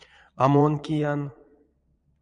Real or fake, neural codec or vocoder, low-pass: fake; vocoder, 22.05 kHz, 80 mel bands, Vocos; 9.9 kHz